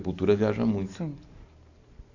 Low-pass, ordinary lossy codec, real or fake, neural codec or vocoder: 7.2 kHz; Opus, 64 kbps; real; none